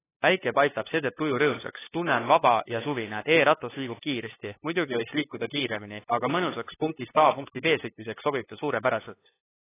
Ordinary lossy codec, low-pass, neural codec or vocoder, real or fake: AAC, 16 kbps; 3.6 kHz; codec, 16 kHz, 2 kbps, FunCodec, trained on LibriTTS, 25 frames a second; fake